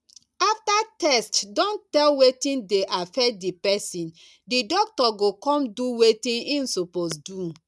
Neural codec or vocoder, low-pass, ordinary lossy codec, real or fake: none; none; none; real